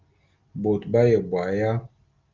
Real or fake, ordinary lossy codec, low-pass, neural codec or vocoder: real; Opus, 24 kbps; 7.2 kHz; none